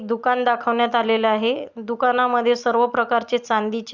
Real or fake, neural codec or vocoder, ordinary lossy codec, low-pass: real; none; Opus, 64 kbps; 7.2 kHz